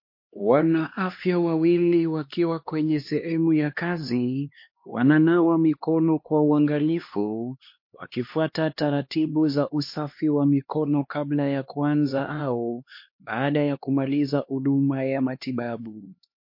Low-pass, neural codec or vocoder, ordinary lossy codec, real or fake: 5.4 kHz; codec, 16 kHz, 2 kbps, X-Codec, HuBERT features, trained on LibriSpeech; MP3, 32 kbps; fake